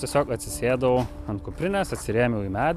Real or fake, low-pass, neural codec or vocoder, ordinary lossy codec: real; 14.4 kHz; none; AAC, 96 kbps